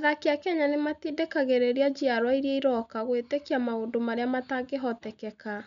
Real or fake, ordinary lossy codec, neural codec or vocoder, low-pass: real; none; none; 7.2 kHz